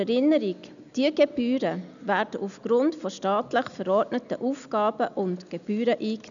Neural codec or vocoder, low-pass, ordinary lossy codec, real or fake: none; 7.2 kHz; none; real